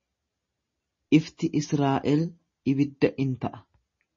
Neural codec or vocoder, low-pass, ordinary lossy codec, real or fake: none; 7.2 kHz; MP3, 32 kbps; real